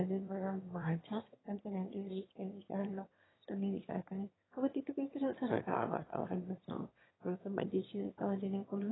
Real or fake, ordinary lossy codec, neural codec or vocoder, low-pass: fake; AAC, 16 kbps; autoencoder, 22.05 kHz, a latent of 192 numbers a frame, VITS, trained on one speaker; 7.2 kHz